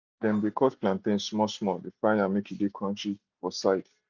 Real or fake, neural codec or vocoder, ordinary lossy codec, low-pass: real; none; none; 7.2 kHz